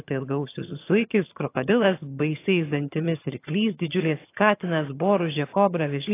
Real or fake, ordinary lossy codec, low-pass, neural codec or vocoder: fake; AAC, 24 kbps; 3.6 kHz; vocoder, 22.05 kHz, 80 mel bands, HiFi-GAN